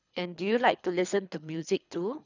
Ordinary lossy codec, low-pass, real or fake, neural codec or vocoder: none; 7.2 kHz; fake; codec, 24 kHz, 3 kbps, HILCodec